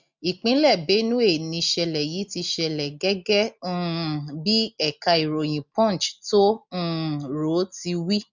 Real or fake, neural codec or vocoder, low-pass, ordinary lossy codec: real; none; 7.2 kHz; none